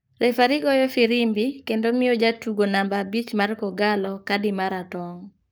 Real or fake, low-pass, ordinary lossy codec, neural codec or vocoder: fake; none; none; codec, 44.1 kHz, 7.8 kbps, Pupu-Codec